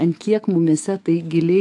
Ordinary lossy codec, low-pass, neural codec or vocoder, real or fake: AAC, 64 kbps; 10.8 kHz; autoencoder, 48 kHz, 128 numbers a frame, DAC-VAE, trained on Japanese speech; fake